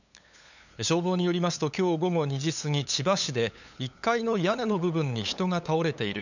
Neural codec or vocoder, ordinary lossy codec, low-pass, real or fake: codec, 16 kHz, 8 kbps, FunCodec, trained on LibriTTS, 25 frames a second; none; 7.2 kHz; fake